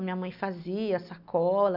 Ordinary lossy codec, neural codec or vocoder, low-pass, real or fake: none; none; 5.4 kHz; real